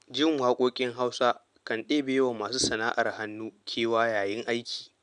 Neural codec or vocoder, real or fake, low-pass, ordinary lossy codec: none; real; 9.9 kHz; none